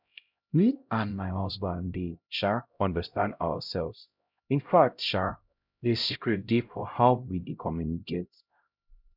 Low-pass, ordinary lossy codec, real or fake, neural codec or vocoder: 5.4 kHz; none; fake; codec, 16 kHz, 0.5 kbps, X-Codec, HuBERT features, trained on LibriSpeech